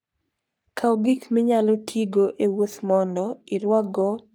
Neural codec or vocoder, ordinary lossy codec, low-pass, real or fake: codec, 44.1 kHz, 3.4 kbps, Pupu-Codec; none; none; fake